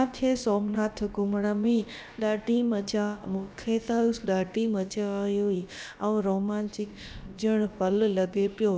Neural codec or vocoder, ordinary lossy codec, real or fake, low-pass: codec, 16 kHz, about 1 kbps, DyCAST, with the encoder's durations; none; fake; none